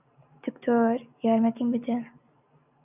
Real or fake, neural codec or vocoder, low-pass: real; none; 3.6 kHz